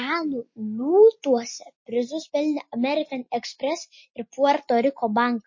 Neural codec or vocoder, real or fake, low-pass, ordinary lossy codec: none; real; 7.2 kHz; MP3, 32 kbps